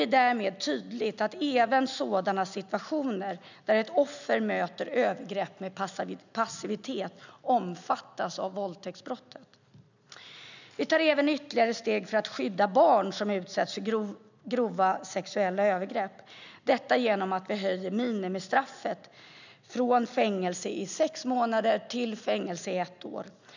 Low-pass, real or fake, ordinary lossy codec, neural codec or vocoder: 7.2 kHz; real; none; none